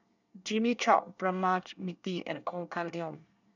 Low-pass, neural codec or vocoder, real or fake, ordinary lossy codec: 7.2 kHz; codec, 24 kHz, 1 kbps, SNAC; fake; none